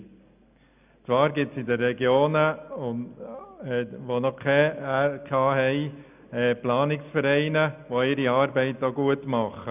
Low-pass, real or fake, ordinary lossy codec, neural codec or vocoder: 3.6 kHz; real; none; none